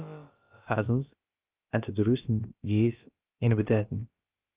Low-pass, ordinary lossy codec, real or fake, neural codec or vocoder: 3.6 kHz; Opus, 32 kbps; fake; codec, 16 kHz, about 1 kbps, DyCAST, with the encoder's durations